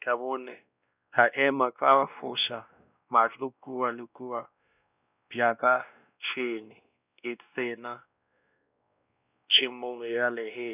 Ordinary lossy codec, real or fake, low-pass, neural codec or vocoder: none; fake; 3.6 kHz; codec, 16 kHz, 1 kbps, X-Codec, WavLM features, trained on Multilingual LibriSpeech